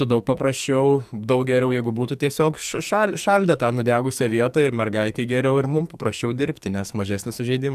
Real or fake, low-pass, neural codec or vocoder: fake; 14.4 kHz; codec, 44.1 kHz, 2.6 kbps, SNAC